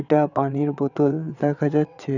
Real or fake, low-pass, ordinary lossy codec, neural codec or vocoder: fake; 7.2 kHz; none; vocoder, 22.05 kHz, 80 mel bands, WaveNeXt